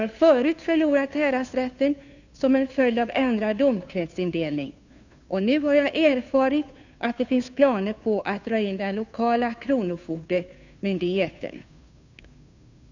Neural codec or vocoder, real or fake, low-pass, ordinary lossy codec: codec, 16 kHz, 2 kbps, FunCodec, trained on Chinese and English, 25 frames a second; fake; 7.2 kHz; none